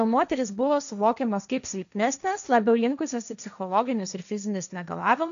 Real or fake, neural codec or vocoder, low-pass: fake; codec, 16 kHz, 1.1 kbps, Voila-Tokenizer; 7.2 kHz